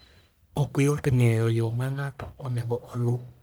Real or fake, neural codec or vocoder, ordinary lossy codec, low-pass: fake; codec, 44.1 kHz, 1.7 kbps, Pupu-Codec; none; none